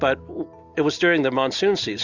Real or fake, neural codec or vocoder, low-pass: real; none; 7.2 kHz